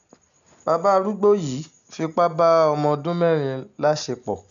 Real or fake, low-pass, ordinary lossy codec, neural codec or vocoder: real; 7.2 kHz; none; none